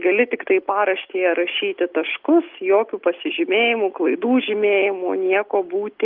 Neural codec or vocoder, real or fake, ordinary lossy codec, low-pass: none; real; Opus, 24 kbps; 5.4 kHz